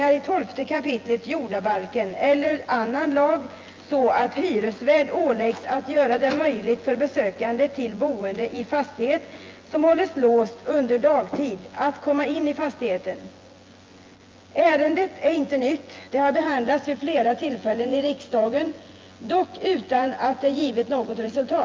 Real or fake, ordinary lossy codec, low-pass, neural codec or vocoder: fake; Opus, 24 kbps; 7.2 kHz; vocoder, 24 kHz, 100 mel bands, Vocos